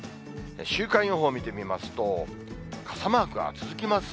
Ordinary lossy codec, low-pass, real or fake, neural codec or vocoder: none; none; real; none